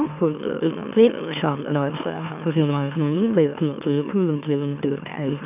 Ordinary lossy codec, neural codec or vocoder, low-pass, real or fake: none; autoencoder, 44.1 kHz, a latent of 192 numbers a frame, MeloTTS; 3.6 kHz; fake